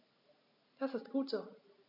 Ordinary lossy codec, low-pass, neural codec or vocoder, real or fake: none; 5.4 kHz; none; real